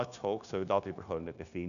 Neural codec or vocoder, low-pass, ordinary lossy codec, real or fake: codec, 16 kHz, 0.9 kbps, LongCat-Audio-Codec; 7.2 kHz; none; fake